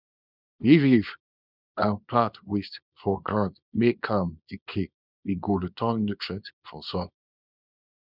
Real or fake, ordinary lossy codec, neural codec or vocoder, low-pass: fake; none; codec, 24 kHz, 0.9 kbps, WavTokenizer, small release; 5.4 kHz